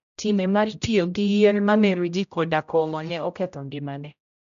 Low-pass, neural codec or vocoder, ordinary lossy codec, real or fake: 7.2 kHz; codec, 16 kHz, 0.5 kbps, X-Codec, HuBERT features, trained on general audio; none; fake